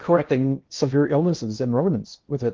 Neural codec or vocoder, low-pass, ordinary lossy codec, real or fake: codec, 16 kHz in and 24 kHz out, 0.6 kbps, FocalCodec, streaming, 2048 codes; 7.2 kHz; Opus, 32 kbps; fake